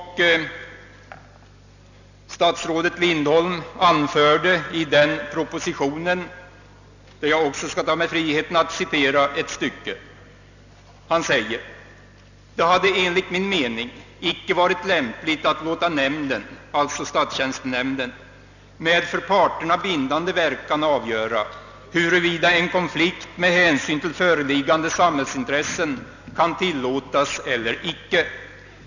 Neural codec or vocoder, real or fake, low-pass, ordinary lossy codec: none; real; 7.2 kHz; none